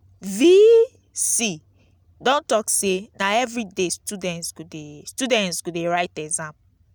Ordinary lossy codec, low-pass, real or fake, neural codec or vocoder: none; none; real; none